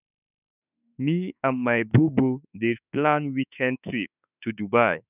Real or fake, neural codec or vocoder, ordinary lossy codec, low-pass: fake; autoencoder, 48 kHz, 32 numbers a frame, DAC-VAE, trained on Japanese speech; none; 3.6 kHz